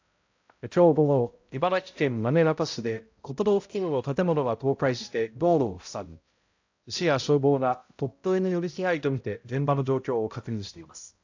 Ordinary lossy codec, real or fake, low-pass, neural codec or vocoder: AAC, 48 kbps; fake; 7.2 kHz; codec, 16 kHz, 0.5 kbps, X-Codec, HuBERT features, trained on balanced general audio